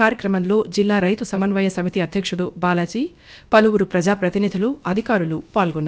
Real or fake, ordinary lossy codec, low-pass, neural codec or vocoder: fake; none; none; codec, 16 kHz, about 1 kbps, DyCAST, with the encoder's durations